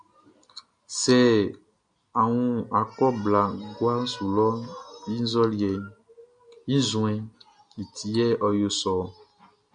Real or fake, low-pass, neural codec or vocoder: real; 9.9 kHz; none